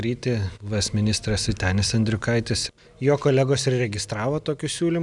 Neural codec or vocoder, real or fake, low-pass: none; real; 10.8 kHz